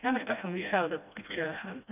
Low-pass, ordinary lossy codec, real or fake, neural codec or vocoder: 3.6 kHz; none; fake; codec, 16 kHz, 1 kbps, FreqCodec, smaller model